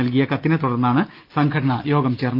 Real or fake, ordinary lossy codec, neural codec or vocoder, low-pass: real; Opus, 24 kbps; none; 5.4 kHz